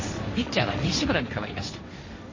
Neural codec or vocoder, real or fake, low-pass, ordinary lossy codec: codec, 16 kHz, 1.1 kbps, Voila-Tokenizer; fake; 7.2 kHz; MP3, 32 kbps